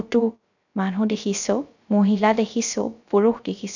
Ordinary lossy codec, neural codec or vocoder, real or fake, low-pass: none; codec, 16 kHz, 0.3 kbps, FocalCodec; fake; 7.2 kHz